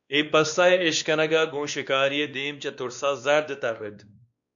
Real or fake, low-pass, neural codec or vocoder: fake; 7.2 kHz; codec, 16 kHz, 2 kbps, X-Codec, WavLM features, trained on Multilingual LibriSpeech